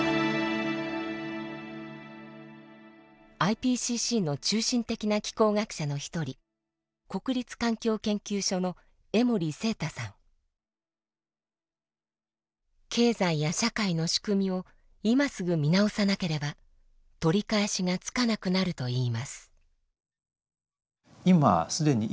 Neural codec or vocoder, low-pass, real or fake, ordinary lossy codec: none; none; real; none